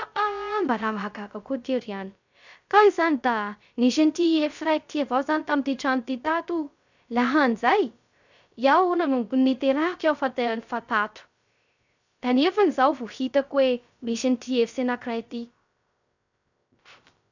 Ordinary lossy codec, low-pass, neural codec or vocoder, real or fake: none; 7.2 kHz; codec, 16 kHz, 0.3 kbps, FocalCodec; fake